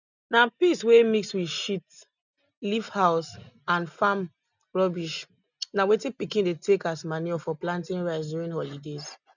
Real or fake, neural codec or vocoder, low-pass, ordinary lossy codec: real; none; 7.2 kHz; none